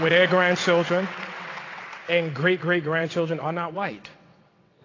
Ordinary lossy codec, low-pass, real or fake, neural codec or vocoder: AAC, 32 kbps; 7.2 kHz; fake; vocoder, 22.05 kHz, 80 mel bands, WaveNeXt